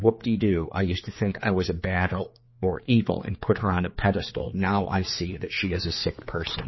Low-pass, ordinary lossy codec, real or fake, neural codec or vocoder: 7.2 kHz; MP3, 24 kbps; fake; codec, 16 kHz, 4 kbps, X-Codec, HuBERT features, trained on general audio